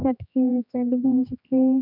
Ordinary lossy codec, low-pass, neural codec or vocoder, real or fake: none; 5.4 kHz; codec, 16 kHz, 2 kbps, X-Codec, HuBERT features, trained on general audio; fake